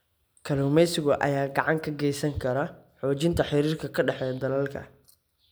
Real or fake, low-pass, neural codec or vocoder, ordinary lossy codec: real; none; none; none